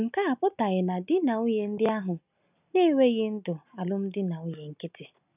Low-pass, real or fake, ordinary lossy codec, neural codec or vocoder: 3.6 kHz; real; none; none